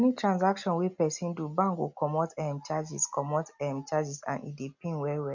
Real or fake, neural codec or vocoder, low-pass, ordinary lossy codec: real; none; 7.2 kHz; none